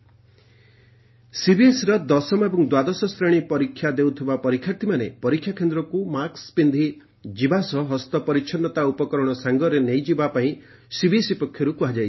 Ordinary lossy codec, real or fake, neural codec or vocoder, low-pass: MP3, 24 kbps; real; none; 7.2 kHz